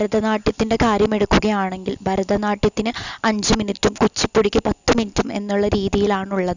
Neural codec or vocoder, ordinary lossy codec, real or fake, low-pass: none; none; real; 7.2 kHz